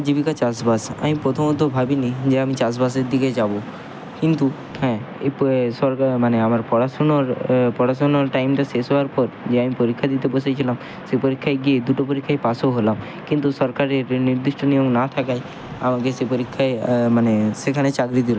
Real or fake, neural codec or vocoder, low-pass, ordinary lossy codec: real; none; none; none